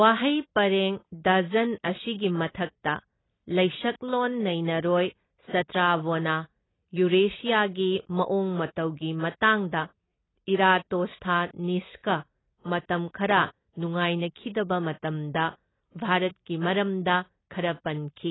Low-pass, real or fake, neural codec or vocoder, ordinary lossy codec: 7.2 kHz; real; none; AAC, 16 kbps